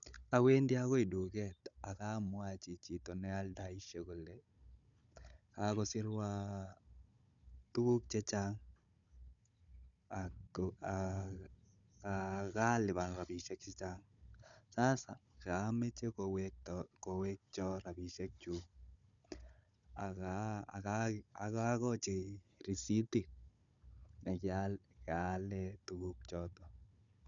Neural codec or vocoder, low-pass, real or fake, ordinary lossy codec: codec, 16 kHz, 16 kbps, FunCodec, trained on Chinese and English, 50 frames a second; 7.2 kHz; fake; none